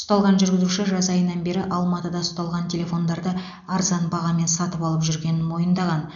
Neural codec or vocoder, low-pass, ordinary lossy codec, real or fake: none; 7.2 kHz; none; real